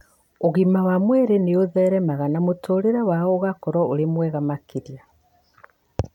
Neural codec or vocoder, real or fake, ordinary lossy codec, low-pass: none; real; none; 19.8 kHz